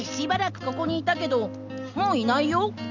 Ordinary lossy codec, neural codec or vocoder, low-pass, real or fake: none; none; 7.2 kHz; real